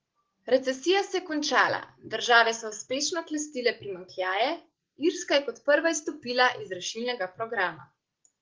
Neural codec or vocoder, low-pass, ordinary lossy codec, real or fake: none; 7.2 kHz; Opus, 16 kbps; real